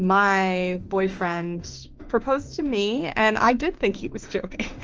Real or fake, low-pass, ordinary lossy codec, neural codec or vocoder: fake; 7.2 kHz; Opus, 24 kbps; autoencoder, 48 kHz, 32 numbers a frame, DAC-VAE, trained on Japanese speech